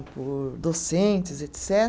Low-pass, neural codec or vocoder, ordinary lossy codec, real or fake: none; none; none; real